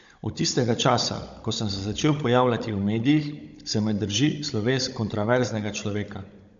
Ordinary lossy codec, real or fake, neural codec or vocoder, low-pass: MP3, 64 kbps; fake; codec, 16 kHz, 16 kbps, FunCodec, trained on Chinese and English, 50 frames a second; 7.2 kHz